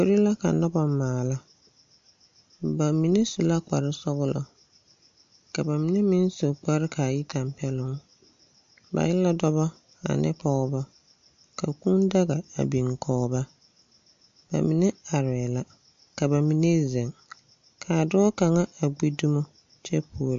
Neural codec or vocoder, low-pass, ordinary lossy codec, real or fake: none; 7.2 kHz; MP3, 48 kbps; real